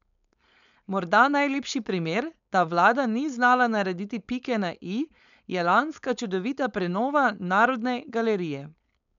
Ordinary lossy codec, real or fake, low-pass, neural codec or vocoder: none; fake; 7.2 kHz; codec, 16 kHz, 4.8 kbps, FACodec